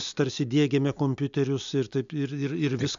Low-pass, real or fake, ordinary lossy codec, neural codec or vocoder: 7.2 kHz; real; AAC, 96 kbps; none